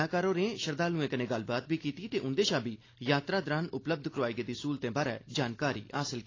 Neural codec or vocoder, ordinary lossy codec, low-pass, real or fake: none; AAC, 32 kbps; 7.2 kHz; real